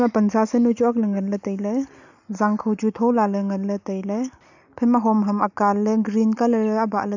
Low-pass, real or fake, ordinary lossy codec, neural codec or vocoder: 7.2 kHz; real; none; none